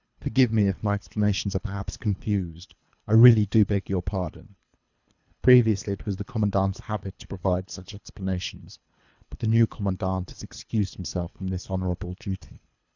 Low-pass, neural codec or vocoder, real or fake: 7.2 kHz; codec, 24 kHz, 3 kbps, HILCodec; fake